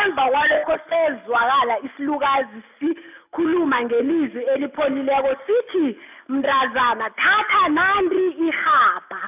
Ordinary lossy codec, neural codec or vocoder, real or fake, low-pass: none; none; real; 3.6 kHz